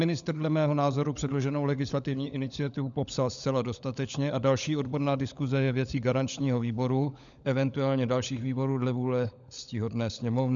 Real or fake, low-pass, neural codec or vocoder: fake; 7.2 kHz; codec, 16 kHz, 16 kbps, FunCodec, trained on LibriTTS, 50 frames a second